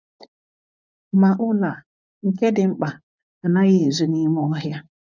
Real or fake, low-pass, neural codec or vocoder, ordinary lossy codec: fake; 7.2 kHz; vocoder, 24 kHz, 100 mel bands, Vocos; none